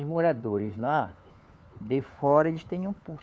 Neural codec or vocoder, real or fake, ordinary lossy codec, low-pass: codec, 16 kHz, 4 kbps, FunCodec, trained on LibriTTS, 50 frames a second; fake; none; none